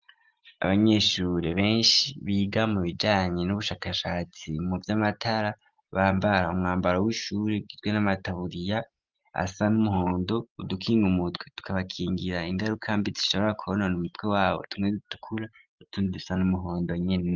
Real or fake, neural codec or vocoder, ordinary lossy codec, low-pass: real; none; Opus, 32 kbps; 7.2 kHz